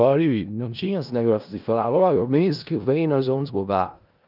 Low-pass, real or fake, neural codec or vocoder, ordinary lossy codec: 5.4 kHz; fake; codec, 16 kHz in and 24 kHz out, 0.4 kbps, LongCat-Audio-Codec, four codebook decoder; Opus, 24 kbps